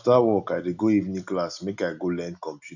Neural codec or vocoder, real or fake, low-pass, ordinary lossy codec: none; real; 7.2 kHz; none